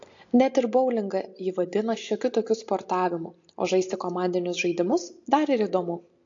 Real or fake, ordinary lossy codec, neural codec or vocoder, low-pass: real; AAC, 48 kbps; none; 7.2 kHz